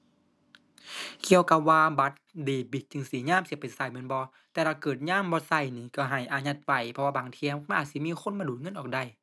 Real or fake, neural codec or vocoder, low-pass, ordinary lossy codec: real; none; none; none